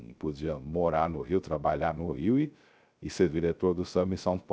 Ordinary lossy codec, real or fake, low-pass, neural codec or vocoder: none; fake; none; codec, 16 kHz, 0.3 kbps, FocalCodec